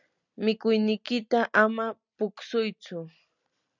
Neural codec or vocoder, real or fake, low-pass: none; real; 7.2 kHz